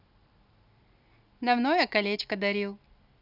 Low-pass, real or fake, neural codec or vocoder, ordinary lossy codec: 5.4 kHz; real; none; none